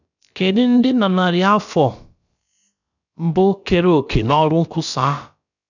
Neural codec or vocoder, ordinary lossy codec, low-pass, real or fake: codec, 16 kHz, about 1 kbps, DyCAST, with the encoder's durations; none; 7.2 kHz; fake